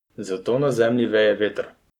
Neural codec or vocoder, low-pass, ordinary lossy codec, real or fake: codec, 44.1 kHz, 7.8 kbps, Pupu-Codec; 19.8 kHz; none; fake